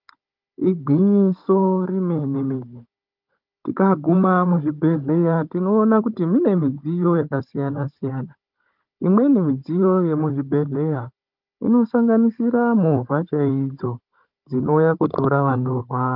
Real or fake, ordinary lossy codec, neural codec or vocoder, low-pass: fake; Opus, 24 kbps; codec, 16 kHz, 16 kbps, FunCodec, trained on Chinese and English, 50 frames a second; 5.4 kHz